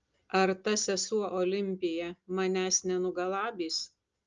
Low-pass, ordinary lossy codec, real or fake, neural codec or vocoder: 7.2 kHz; Opus, 24 kbps; real; none